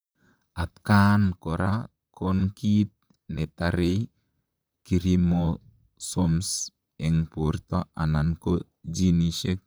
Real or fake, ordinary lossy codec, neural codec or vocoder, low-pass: fake; none; vocoder, 44.1 kHz, 128 mel bands, Pupu-Vocoder; none